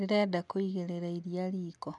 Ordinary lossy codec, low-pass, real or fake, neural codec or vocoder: none; 10.8 kHz; real; none